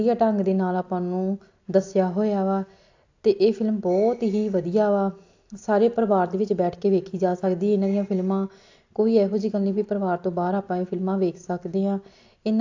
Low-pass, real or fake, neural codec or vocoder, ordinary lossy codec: 7.2 kHz; real; none; AAC, 48 kbps